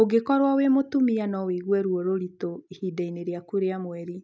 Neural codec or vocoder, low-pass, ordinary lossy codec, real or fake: none; none; none; real